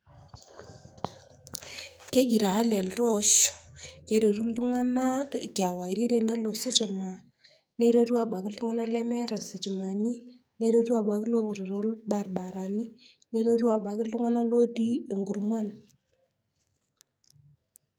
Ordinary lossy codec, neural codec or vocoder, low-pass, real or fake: none; codec, 44.1 kHz, 2.6 kbps, SNAC; none; fake